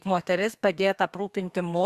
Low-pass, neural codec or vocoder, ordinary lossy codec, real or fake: 14.4 kHz; codec, 32 kHz, 1.9 kbps, SNAC; Opus, 64 kbps; fake